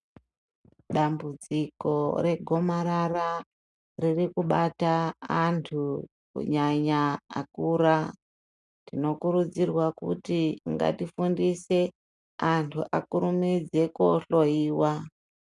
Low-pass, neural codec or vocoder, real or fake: 10.8 kHz; none; real